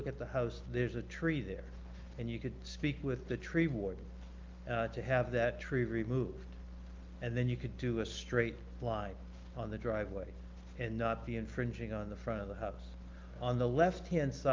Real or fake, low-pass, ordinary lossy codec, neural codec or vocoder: real; 7.2 kHz; Opus, 32 kbps; none